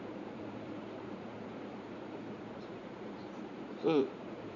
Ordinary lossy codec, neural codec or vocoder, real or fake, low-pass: none; vocoder, 44.1 kHz, 80 mel bands, Vocos; fake; 7.2 kHz